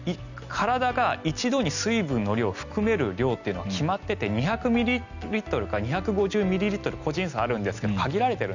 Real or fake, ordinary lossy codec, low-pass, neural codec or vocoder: real; none; 7.2 kHz; none